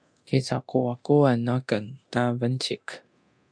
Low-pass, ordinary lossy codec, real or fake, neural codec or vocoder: 9.9 kHz; MP3, 64 kbps; fake; codec, 24 kHz, 0.9 kbps, DualCodec